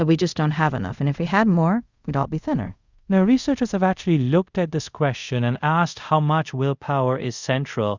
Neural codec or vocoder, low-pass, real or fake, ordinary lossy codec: codec, 24 kHz, 0.5 kbps, DualCodec; 7.2 kHz; fake; Opus, 64 kbps